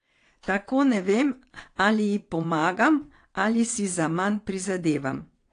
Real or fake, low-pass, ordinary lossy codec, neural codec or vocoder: fake; 9.9 kHz; AAC, 48 kbps; vocoder, 22.05 kHz, 80 mel bands, WaveNeXt